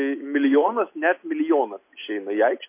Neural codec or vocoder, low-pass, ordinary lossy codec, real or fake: none; 3.6 kHz; MP3, 24 kbps; real